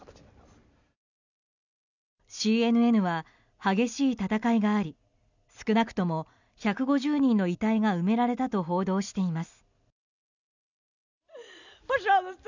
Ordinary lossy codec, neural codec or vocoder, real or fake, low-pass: none; none; real; 7.2 kHz